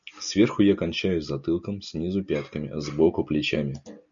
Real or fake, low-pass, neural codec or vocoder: real; 7.2 kHz; none